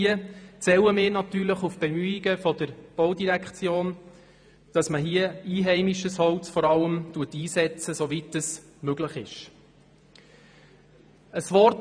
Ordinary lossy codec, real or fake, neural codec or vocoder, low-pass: none; fake; vocoder, 48 kHz, 128 mel bands, Vocos; 9.9 kHz